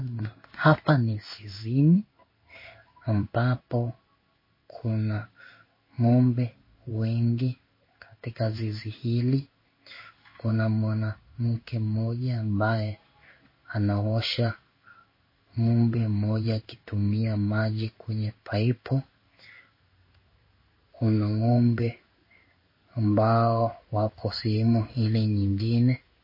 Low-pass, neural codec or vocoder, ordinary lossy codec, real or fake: 5.4 kHz; codec, 16 kHz in and 24 kHz out, 1 kbps, XY-Tokenizer; MP3, 24 kbps; fake